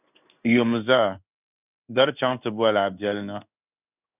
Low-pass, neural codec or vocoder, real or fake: 3.6 kHz; codec, 16 kHz in and 24 kHz out, 1 kbps, XY-Tokenizer; fake